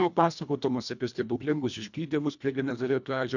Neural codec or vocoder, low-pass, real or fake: codec, 24 kHz, 1.5 kbps, HILCodec; 7.2 kHz; fake